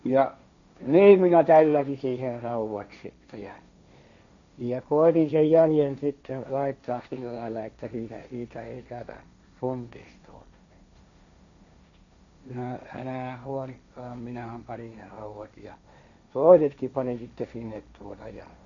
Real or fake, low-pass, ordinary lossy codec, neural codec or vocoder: fake; 7.2 kHz; none; codec, 16 kHz, 1.1 kbps, Voila-Tokenizer